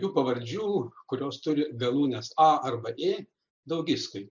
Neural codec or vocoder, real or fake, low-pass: none; real; 7.2 kHz